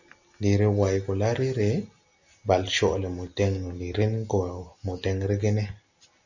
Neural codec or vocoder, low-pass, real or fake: none; 7.2 kHz; real